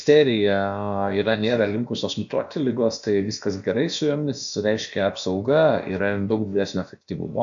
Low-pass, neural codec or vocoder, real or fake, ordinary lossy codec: 7.2 kHz; codec, 16 kHz, about 1 kbps, DyCAST, with the encoder's durations; fake; AAC, 64 kbps